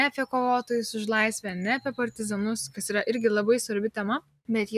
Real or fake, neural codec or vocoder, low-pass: real; none; 14.4 kHz